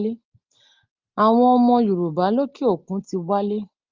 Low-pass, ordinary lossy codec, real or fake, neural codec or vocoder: 7.2 kHz; Opus, 24 kbps; real; none